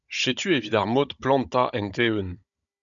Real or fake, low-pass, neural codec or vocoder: fake; 7.2 kHz; codec, 16 kHz, 16 kbps, FunCodec, trained on Chinese and English, 50 frames a second